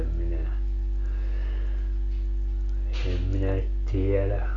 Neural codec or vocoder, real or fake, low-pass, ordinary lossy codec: none; real; 7.2 kHz; none